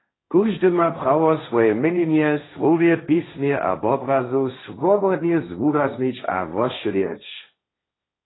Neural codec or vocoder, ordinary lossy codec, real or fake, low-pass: codec, 16 kHz, 1.1 kbps, Voila-Tokenizer; AAC, 16 kbps; fake; 7.2 kHz